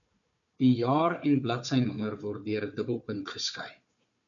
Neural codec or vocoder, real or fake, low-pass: codec, 16 kHz, 4 kbps, FunCodec, trained on Chinese and English, 50 frames a second; fake; 7.2 kHz